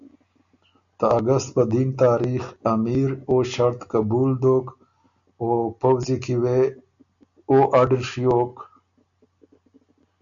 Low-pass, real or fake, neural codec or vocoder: 7.2 kHz; real; none